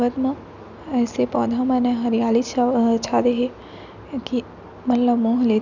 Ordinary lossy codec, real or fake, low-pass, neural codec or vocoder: none; real; 7.2 kHz; none